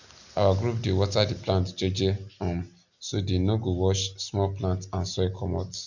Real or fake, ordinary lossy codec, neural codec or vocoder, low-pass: real; none; none; 7.2 kHz